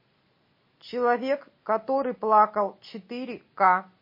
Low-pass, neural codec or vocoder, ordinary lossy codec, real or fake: 5.4 kHz; none; MP3, 24 kbps; real